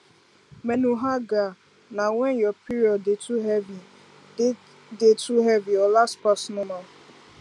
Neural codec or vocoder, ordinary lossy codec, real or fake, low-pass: none; none; real; none